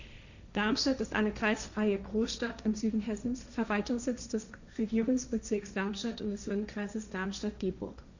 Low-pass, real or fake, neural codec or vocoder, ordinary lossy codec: 7.2 kHz; fake; codec, 16 kHz, 1.1 kbps, Voila-Tokenizer; none